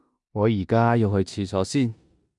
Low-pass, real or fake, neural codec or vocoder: 10.8 kHz; fake; codec, 16 kHz in and 24 kHz out, 0.9 kbps, LongCat-Audio-Codec, four codebook decoder